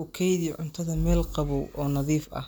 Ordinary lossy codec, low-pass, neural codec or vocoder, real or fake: none; none; none; real